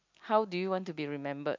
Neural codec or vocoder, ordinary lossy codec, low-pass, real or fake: none; MP3, 64 kbps; 7.2 kHz; real